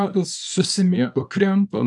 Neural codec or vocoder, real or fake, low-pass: codec, 24 kHz, 0.9 kbps, WavTokenizer, small release; fake; 10.8 kHz